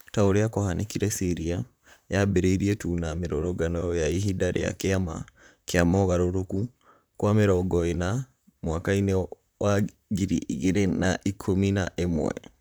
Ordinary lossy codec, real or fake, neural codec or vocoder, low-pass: none; fake; vocoder, 44.1 kHz, 128 mel bands, Pupu-Vocoder; none